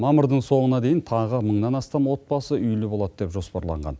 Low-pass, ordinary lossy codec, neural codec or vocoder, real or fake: none; none; none; real